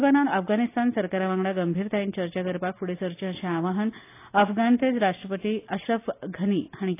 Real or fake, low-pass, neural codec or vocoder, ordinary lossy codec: real; 3.6 kHz; none; AAC, 24 kbps